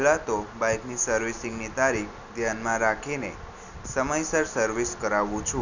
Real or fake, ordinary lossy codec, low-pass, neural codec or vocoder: real; none; 7.2 kHz; none